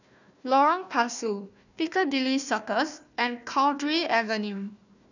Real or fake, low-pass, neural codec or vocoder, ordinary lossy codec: fake; 7.2 kHz; codec, 16 kHz, 1 kbps, FunCodec, trained on Chinese and English, 50 frames a second; none